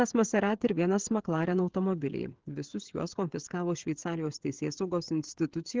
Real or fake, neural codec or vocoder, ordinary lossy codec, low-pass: fake; codec, 16 kHz, 16 kbps, FreqCodec, smaller model; Opus, 16 kbps; 7.2 kHz